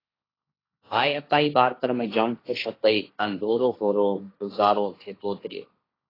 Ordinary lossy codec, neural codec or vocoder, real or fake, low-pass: AAC, 24 kbps; codec, 16 kHz, 1.1 kbps, Voila-Tokenizer; fake; 5.4 kHz